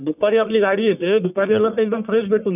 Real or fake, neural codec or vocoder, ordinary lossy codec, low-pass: fake; codec, 44.1 kHz, 1.7 kbps, Pupu-Codec; none; 3.6 kHz